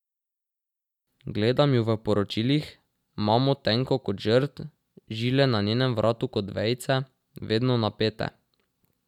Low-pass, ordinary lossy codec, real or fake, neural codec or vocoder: 19.8 kHz; none; real; none